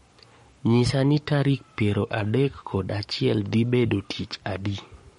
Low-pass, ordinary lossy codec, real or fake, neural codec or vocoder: 19.8 kHz; MP3, 48 kbps; fake; codec, 44.1 kHz, 7.8 kbps, Pupu-Codec